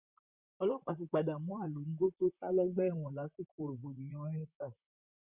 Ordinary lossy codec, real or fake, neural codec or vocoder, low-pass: Opus, 64 kbps; fake; vocoder, 44.1 kHz, 128 mel bands, Pupu-Vocoder; 3.6 kHz